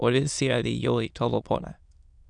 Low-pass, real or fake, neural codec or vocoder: 9.9 kHz; fake; autoencoder, 22.05 kHz, a latent of 192 numbers a frame, VITS, trained on many speakers